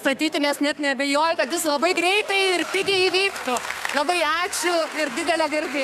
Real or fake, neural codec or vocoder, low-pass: fake; codec, 32 kHz, 1.9 kbps, SNAC; 14.4 kHz